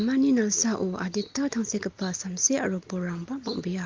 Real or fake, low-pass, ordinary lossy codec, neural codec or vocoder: real; 7.2 kHz; Opus, 24 kbps; none